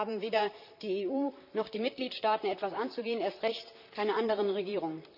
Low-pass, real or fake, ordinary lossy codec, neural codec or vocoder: 5.4 kHz; fake; none; vocoder, 44.1 kHz, 128 mel bands, Pupu-Vocoder